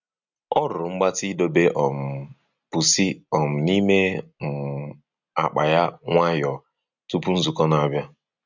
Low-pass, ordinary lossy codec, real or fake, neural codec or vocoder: 7.2 kHz; none; real; none